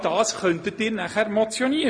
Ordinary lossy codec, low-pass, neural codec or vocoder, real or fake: MP3, 48 kbps; 9.9 kHz; none; real